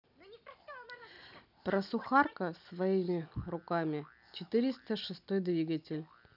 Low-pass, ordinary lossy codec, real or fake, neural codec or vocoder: 5.4 kHz; none; real; none